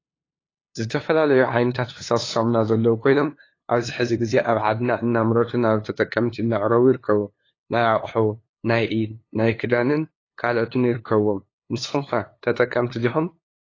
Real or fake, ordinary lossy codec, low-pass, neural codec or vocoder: fake; AAC, 32 kbps; 7.2 kHz; codec, 16 kHz, 2 kbps, FunCodec, trained on LibriTTS, 25 frames a second